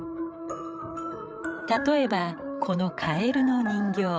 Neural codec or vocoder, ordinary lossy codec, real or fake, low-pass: codec, 16 kHz, 8 kbps, FreqCodec, larger model; none; fake; none